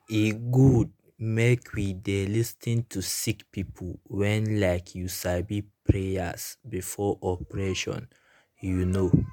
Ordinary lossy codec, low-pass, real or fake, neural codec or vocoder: MP3, 96 kbps; 19.8 kHz; real; none